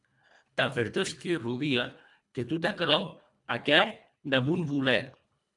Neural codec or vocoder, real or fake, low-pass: codec, 24 kHz, 1.5 kbps, HILCodec; fake; 10.8 kHz